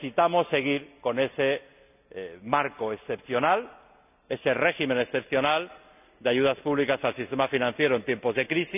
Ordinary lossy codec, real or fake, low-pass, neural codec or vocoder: none; real; 3.6 kHz; none